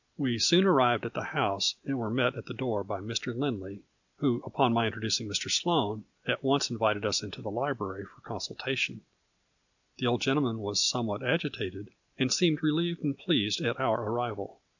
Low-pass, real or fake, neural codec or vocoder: 7.2 kHz; real; none